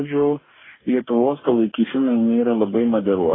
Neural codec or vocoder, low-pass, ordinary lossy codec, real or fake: codec, 44.1 kHz, 2.6 kbps, DAC; 7.2 kHz; AAC, 16 kbps; fake